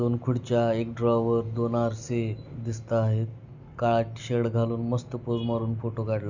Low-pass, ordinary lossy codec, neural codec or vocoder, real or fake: 7.2 kHz; Opus, 64 kbps; none; real